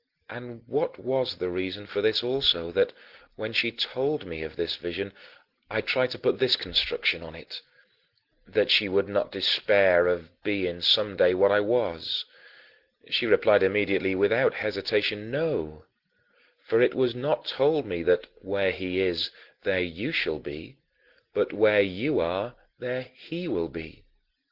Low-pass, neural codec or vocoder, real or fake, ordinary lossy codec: 5.4 kHz; none; real; Opus, 16 kbps